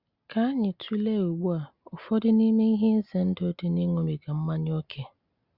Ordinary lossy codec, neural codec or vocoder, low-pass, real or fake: Opus, 64 kbps; none; 5.4 kHz; real